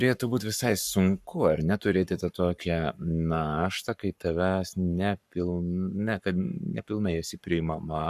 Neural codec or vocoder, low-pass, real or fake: codec, 44.1 kHz, 7.8 kbps, Pupu-Codec; 14.4 kHz; fake